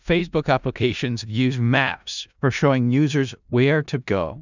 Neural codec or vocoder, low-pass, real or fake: codec, 16 kHz in and 24 kHz out, 0.4 kbps, LongCat-Audio-Codec, four codebook decoder; 7.2 kHz; fake